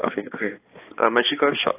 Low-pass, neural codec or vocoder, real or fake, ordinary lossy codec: 3.6 kHz; codec, 16 kHz, 2 kbps, X-Codec, HuBERT features, trained on balanced general audio; fake; AAC, 16 kbps